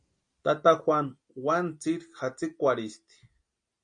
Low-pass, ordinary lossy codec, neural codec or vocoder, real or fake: 9.9 kHz; MP3, 48 kbps; none; real